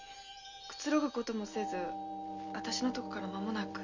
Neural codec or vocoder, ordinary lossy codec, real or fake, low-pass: none; none; real; 7.2 kHz